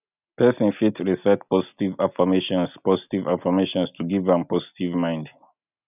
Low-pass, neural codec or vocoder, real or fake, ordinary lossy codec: 3.6 kHz; none; real; none